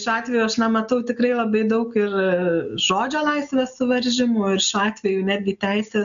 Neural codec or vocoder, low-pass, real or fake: none; 7.2 kHz; real